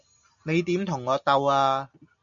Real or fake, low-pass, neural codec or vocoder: real; 7.2 kHz; none